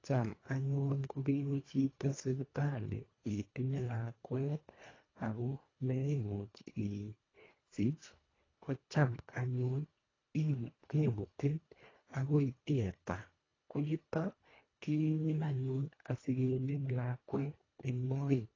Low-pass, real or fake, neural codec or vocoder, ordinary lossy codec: 7.2 kHz; fake; codec, 24 kHz, 1.5 kbps, HILCodec; AAC, 32 kbps